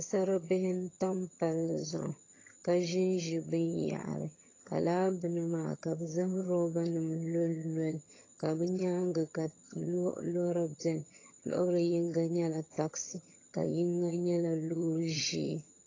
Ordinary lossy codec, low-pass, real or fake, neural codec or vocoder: AAC, 32 kbps; 7.2 kHz; fake; vocoder, 22.05 kHz, 80 mel bands, HiFi-GAN